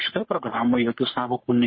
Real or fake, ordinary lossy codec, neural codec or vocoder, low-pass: fake; MP3, 24 kbps; codec, 44.1 kHz, 3.4 kbps, Pupu-Codec; 7.2 kHz